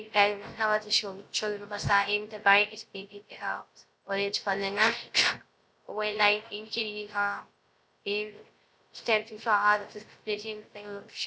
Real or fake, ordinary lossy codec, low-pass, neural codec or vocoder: fake; none; none; codec, 16 kHz, 0.3 kbps, FocalCodec